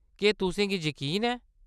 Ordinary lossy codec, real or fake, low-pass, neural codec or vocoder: none; real; none; none